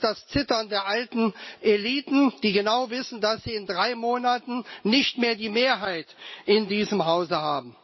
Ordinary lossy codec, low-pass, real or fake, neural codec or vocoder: MP3, 24 kbps; 7.2 kHz; real; none